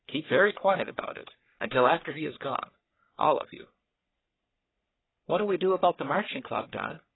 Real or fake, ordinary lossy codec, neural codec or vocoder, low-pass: fake; AAC, 16 kbps; codec, 44.1 kHz, 3.4 kbps, Pupu-Codec; 7.2 kHz